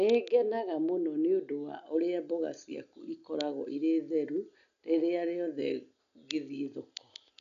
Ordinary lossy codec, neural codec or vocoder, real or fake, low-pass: none; none; real; 7.2 kHz